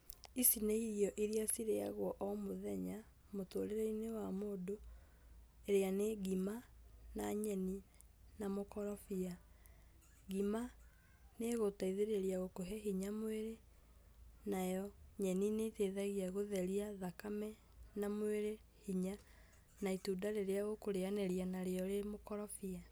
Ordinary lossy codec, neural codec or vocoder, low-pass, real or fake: none; none; none; real